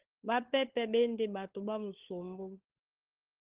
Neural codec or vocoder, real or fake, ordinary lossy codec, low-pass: codec, 16 kHz, 16 kbps, FunCodec, trained on LibriTTS, 50 frames a second; fake; Opus, 16 kbps; 3.6 kHz